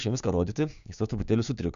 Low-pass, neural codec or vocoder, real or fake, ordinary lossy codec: 7.2 kHz; codec, 16 kHz, 6 kbps, DAC; fake; AAC, 96 kbps